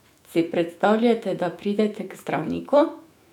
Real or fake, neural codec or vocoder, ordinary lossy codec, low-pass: fake; autoencoder, 48 kHz, 128 numbers a frame, DAC-VAE, trained on Japanese speech; none; 19.8 kHz